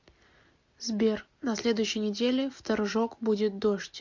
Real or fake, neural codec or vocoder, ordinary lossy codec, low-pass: real; none; MP3, 48 kbps; 7.2 kHz